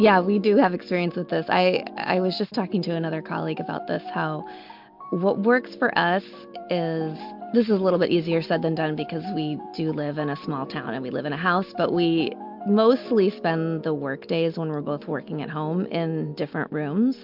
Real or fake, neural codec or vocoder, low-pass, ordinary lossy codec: real; none; 5.4 kHz; MP3, 48 kbps